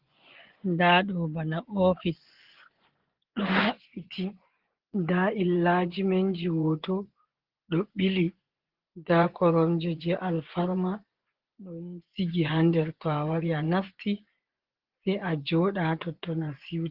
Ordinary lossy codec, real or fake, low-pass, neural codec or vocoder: Opus, 16 kbps; fake; 5.4 kHz; vocoder, 24 kHz, 100 mel bands, Vocos